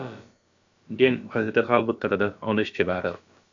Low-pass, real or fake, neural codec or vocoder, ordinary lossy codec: 7.2 kHz; fake; codec, 16 kHz, about 1 kbps, DyCAST, with the encoder's durations; AAC, 64 kbps